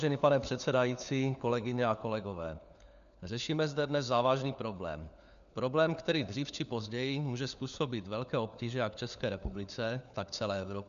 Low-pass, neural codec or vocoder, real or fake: 7.2 kHz; codec, 16 kHz, 4 kbps, FunCodec, trained on LibriTTS, 50 frames a second; fake